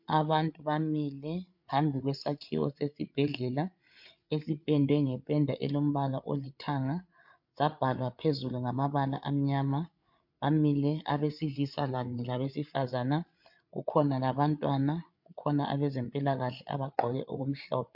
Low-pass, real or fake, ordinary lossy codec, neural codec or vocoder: 5.4 kHz; fake; MP3, 48 kbps; codec, 16 kHz, 8 kbps, FreqCodec, larger model